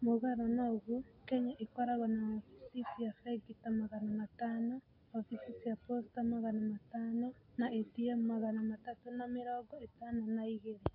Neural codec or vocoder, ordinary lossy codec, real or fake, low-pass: none; AAC, 32 kbps; real; 5.4 kHz